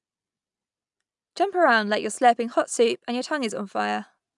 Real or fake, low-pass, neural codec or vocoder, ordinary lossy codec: real; 10.8 kHz; none; none